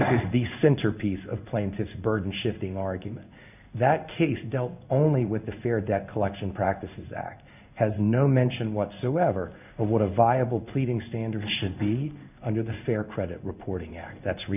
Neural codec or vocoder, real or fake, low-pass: codec, 16 kHz in and 24 kHz out, 1 kbps, XY-Tokenizer; fake; 3.6 kHz